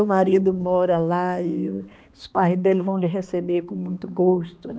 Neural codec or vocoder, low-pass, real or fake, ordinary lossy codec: codec, 16 kHz, 2 kbps, X-Codec, HuBERT features, trained on balanced general audio; none; fake; none